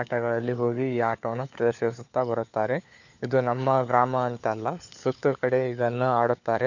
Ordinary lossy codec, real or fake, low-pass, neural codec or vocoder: none; fake; 7.2 kHz; codec, 16 kHz, 4 kbps, FunCodec, trained on LibriTTS, 50 frames a second